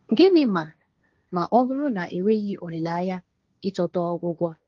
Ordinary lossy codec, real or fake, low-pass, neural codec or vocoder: Opus, 32 kbps; fake; 7.2 kHz; codec, 16 kHz, 1.1 kbps, Voila-Tokenizer